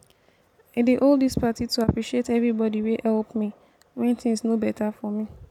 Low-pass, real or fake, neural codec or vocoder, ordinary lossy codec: 19.8 kHz; real; none; none